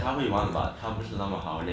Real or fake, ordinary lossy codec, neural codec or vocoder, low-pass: real; none; none; none